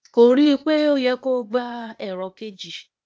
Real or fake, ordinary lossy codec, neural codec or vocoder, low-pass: fake; none; codec, 16 kHz, 0.8 kbps, ZipCodec; none